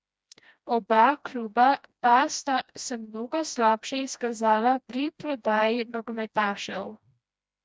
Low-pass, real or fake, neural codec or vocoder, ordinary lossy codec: none; fake; codec, 16 kHz, 1 kbps, FreqCodec, smaller model; none